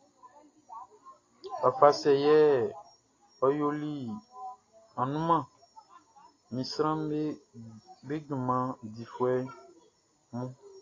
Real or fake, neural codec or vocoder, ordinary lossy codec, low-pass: real; none; AAC, 32 kbps; 7.2 kHz